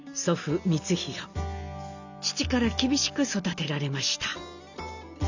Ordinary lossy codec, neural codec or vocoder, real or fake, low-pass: none; none; real; 7.2 kHz